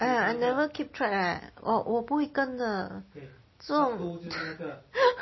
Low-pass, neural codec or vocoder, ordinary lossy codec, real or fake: 7.2 kHz; none; MP3, 24 kbps; real